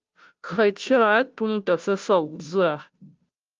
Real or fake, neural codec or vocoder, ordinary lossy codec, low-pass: fake; codec, 16 kHz, 0.5 kbps, FunCodec, trained on Chinese and English, 25 frames a second; Opus, 32 kbps; 7.2 kHz